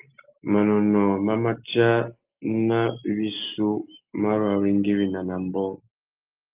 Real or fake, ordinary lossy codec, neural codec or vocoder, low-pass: real; Opus, 24 kbps; none; 3.6 kHz